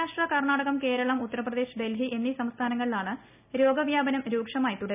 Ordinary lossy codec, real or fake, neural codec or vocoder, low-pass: none; real; none; 3.6 kHz